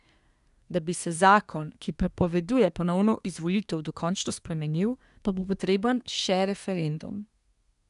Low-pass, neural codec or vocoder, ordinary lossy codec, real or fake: 10.8 kHz; codec, 24 kHz, 1 kbps, SNAC; none; fake